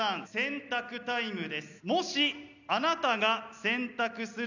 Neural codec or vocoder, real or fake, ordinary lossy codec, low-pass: none; real; none; 7.2 kHz